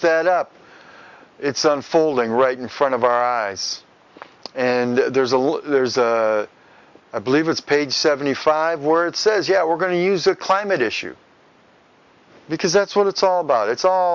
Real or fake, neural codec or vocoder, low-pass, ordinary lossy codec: real; none; 7.2 kHz; Opus, 64 kbps